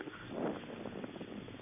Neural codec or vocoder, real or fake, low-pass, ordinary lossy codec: codec, 44.1 kHz, 7.8 kbps, Pupu-Codec; fake; 3.6 kHz; none